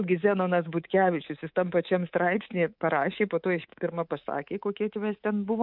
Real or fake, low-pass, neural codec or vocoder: fake; 5.4 kHz; codec, 24 kHz, 3.1 kbps, DualCodec